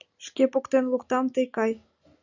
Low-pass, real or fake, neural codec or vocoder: 7.2 kHz; real; none